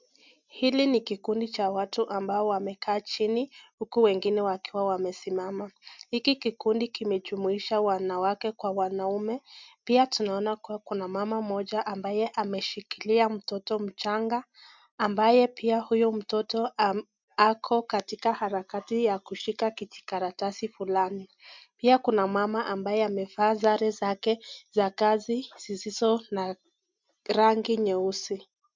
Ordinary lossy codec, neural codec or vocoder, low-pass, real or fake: MP3, 64 kbps; none; 7.2 kHz; real